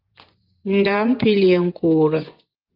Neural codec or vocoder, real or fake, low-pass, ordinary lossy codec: vocoder, 24 kHz, 100 mel bands, Vocos; fake; 5.4 kHz; Opus, 32 kbps